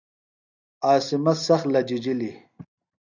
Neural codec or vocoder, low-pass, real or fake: none; 7.2 kHz; real